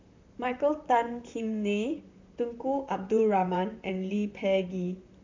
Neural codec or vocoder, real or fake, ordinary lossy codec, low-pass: vocoder, 44.1 kHz, 128 mel bands, Pupu-Vocoder; fake; MP3, 64 kbps; 7.2 kHz